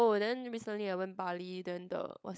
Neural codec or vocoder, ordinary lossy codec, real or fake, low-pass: codec, 16 kHz, 16 kbps, FunCodec, trained on LibriTTS, 50 frames a second; none; fake; none